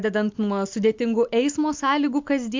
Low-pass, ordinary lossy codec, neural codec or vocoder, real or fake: 7.2 kHz; MP3, 64 kbps; none; real